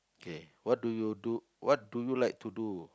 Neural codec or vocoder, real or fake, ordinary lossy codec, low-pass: none; real; none; none